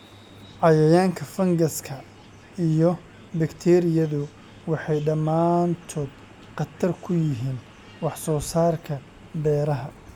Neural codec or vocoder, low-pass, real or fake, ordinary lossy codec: none; 19.8 kHz; real; MP3, 96 kbps